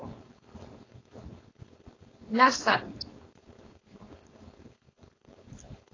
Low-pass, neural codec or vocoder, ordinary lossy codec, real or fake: 7.2 kHz; codec, 16 kHz, 4.8 kbps, FACodec; AAC, 32 kbps; fake